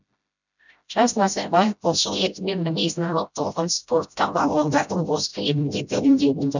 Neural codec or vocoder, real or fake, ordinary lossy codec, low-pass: codec, 16 kHz, 0.5 kbps, FreqCodec, smaller model; fake; none; 7.2 kHz